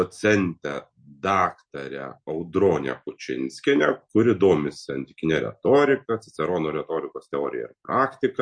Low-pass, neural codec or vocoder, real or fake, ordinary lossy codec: 9.9 kHz; none; real; MP3, 48 kbps